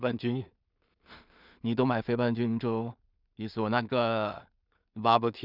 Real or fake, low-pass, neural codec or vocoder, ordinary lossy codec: fake; 5.4 kHz; codec, 16 kHz in and 24 kHz out, 0.4 kbps, LongCat-Audio-Codec, two codebook decoder; none